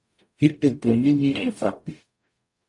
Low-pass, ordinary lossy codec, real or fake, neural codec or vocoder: 10.8 kHz; MP3, 64 kbps; fake; codec, 44.1 kHz, 0.9 kbps, DAC